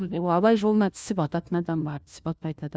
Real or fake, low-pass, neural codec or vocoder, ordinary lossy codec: fake; none; codec, 16 kHz, 1 kbps, FunCodec, trained on LibriTTS, 50 frames a second; none